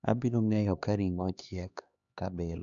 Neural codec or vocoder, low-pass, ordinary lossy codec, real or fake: codec, 16 kHz, 2 kbps, X-Codec, HuBERT features, trained on LibriSpeech; 7.2 kHz; none; fake